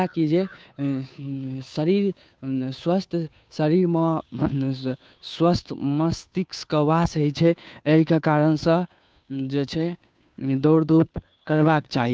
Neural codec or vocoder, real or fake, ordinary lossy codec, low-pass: codec, 16 kHz, 2 kbps, FunCodec, trained on Chinese and English, 25 frames a second; fake; none; none